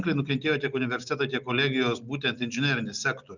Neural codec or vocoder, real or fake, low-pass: none; real; 7.2 kHz